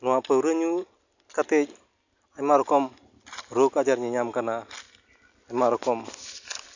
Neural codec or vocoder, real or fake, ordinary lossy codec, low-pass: none; real; none; 7.2 kHz